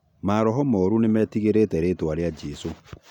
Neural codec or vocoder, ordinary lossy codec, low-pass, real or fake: none; none; 19.8 kHz; real